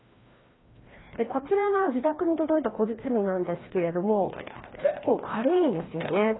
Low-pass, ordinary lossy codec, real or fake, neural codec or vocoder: 7.2 kHz; AAC, 16 kbps; fake; codec, 16 kHz, 1 kbps, FreqCodec, larger model